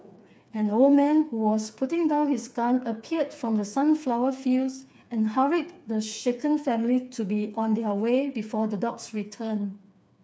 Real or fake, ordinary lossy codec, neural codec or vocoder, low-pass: fake; none; codec, 16 kHz, 4 kbps, FreqCodec, smaller model; none